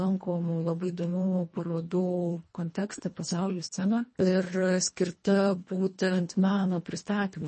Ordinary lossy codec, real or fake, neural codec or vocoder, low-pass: MP3, 32 kbps; fake; codec, 24 kHz, 1.5 kbps, HILCodec; 10.8 kHz